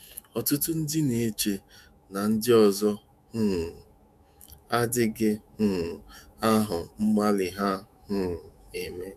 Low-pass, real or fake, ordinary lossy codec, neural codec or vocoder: 14.4 kHz; fake; MP3, 96 kbps; autoencoder, 48 kHz, 128 numbers a frame, DAC-VAE, trained on Japanese speech